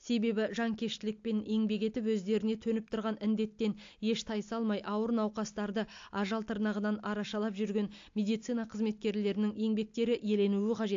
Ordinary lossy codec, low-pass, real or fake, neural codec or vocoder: AAC, 48 kbps; 7.2 kHz; real; none